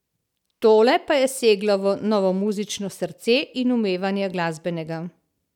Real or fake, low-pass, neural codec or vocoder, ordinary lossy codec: real; 19.8 kHz; none; none